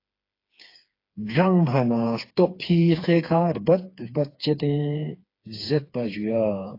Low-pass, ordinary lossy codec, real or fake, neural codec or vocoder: 5.4 kHz; AAC, 32 kbps; fake; codec, 16 kHz, 4 kbps, FreqCodec, smaller model